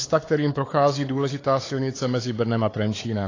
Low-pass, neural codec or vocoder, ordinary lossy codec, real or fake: 7.2 kHz; codec, 16 kHz, 4 kbps, X-Codec, WavLM features, trained on Multilingual LibriSpeech; AAC, 32 kbps; fake